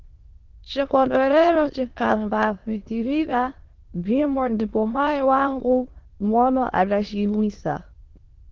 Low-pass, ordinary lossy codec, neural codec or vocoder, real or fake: 7.2 kHz; Opus, 16 kbps; autoencoder, 22.05 kHz, a latent of 192 numbers a frame, VITS, trained on many speakers; fake